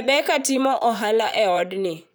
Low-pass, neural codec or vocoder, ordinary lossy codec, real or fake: none; vocoder, 44.1 kHz, 128 mel bands, Pupu-Vocoder; none; fake